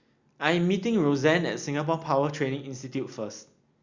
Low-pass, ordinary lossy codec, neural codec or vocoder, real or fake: 7.2 kHz; Opus, 64 kbps; none; real